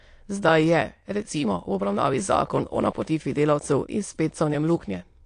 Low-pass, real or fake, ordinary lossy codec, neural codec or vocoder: 9.9 kHz; fake; AAC, 48 kbps; autoencoder, 22.05 kHz, a latent of 192 numbers a frame, VITS, trained on many speakers